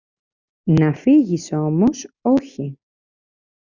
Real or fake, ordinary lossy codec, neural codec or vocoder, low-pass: real; Opus, 64 kbps; none; 7.2 kHz